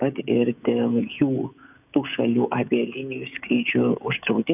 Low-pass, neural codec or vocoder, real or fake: 3.6 kHz; codec, 16 kHz, 16 kbps, FreqCodec, smaller model; fake